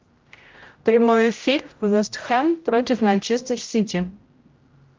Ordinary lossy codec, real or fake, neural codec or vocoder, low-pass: Opus, 24 kbps; fake; codec, 16 kHz, 0.5 kbps, X-Codec, HuBERT features, trained on general audio; 7.2 kHz